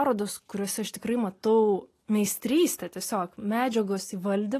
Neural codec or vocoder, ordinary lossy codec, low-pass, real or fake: none; AAC, 48 kbps; 14.4 kHz; real